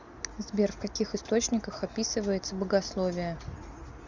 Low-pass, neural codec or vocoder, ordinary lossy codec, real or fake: 7.2 kHz; none; Opus, 64 kbps; real